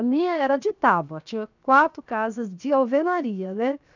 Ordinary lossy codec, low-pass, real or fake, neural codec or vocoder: none; 7.2 kHz; fake; codec, 16 kHz, 0.7 kbps, FocalCodec